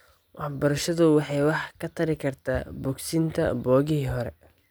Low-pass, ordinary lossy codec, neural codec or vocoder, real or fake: none; none; none; real